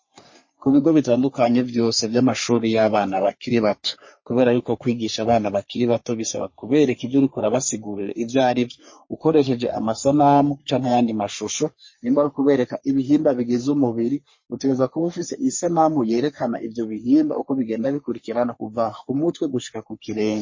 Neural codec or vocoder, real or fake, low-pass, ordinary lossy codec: codec, 44.1 kHz, 3.4 kbps, Pupu-Codec; fake; 7.2 kHz; MP3, 32 kbps